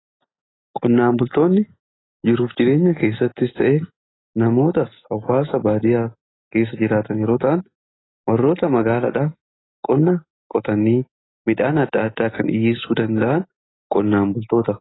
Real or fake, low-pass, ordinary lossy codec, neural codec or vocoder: real; 7.2 kHz; AAC, 16 kbps; none